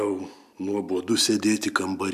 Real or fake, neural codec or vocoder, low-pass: real; none; 14.4 kHz